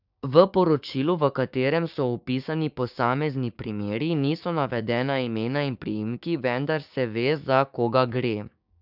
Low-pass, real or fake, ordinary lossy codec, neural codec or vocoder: 5.4 kHz; fake; none; codec, 16 kHz, 6 kbps, DAC